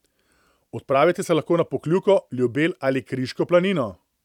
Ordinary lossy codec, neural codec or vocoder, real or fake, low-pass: none; vocoder, 44.1 kHz, 128 mel bands every 256 samples, BigVGAN v2; fake; 19.8 kHz